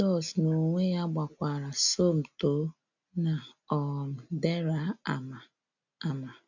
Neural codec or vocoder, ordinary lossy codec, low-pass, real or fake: none; none; 7.2 kHz; real